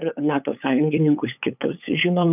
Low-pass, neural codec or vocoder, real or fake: 3.6 kHz; codec, 16 kHz, 8 kbps, FunCodec, trained on LibriTTS, 25 frames a second; fake